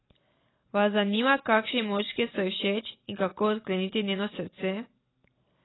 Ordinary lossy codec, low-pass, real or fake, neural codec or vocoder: AAC, 16 kbps; 7.2 kHz; real; none